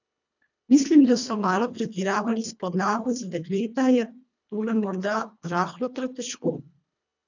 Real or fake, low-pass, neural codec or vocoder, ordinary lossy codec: fake; 7.2 kHz; codec, 24 kHz, 1.5 kbps, HILCodec; none